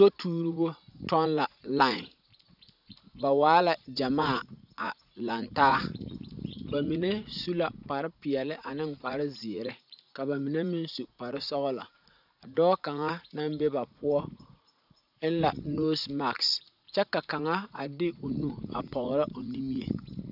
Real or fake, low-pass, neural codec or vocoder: fake; 5.4 kHz; vocoder, 44.1 kHz, 80 mel bands, Vocos